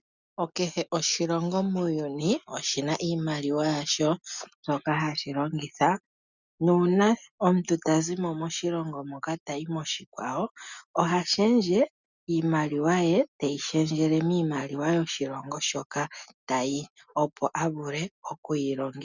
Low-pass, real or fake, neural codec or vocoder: 7.2 kHz; real; none